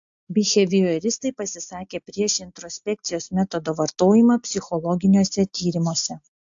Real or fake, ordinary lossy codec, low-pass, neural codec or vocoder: real; AAC, 64 kbps; 7.2 kHz; none